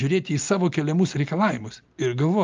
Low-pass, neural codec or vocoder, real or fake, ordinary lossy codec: 7.2 kHz; none; real; Opus, 24 kbps